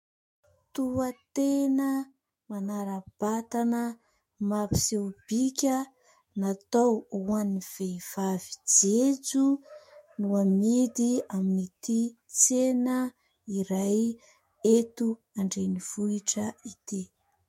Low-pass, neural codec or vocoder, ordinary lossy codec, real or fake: 19.8 kHz; autoencoder, 48 kHz, 128 numbers a frame, DAC-VAE, trained on Japanese speech; MP3, 64 kbps; fake